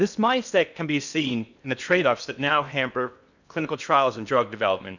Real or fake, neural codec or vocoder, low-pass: fake; codec, 16 kHz in and 24 kHz out, 0.8 kbps, FocalCodec, streaming, 65536 codes; 7.2 kHz